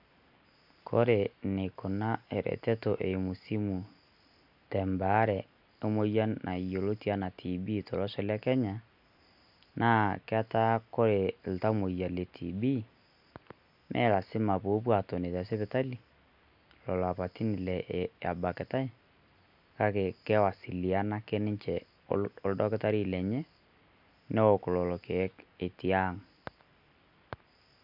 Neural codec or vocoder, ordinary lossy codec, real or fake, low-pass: none; none; real; 5.4 kHz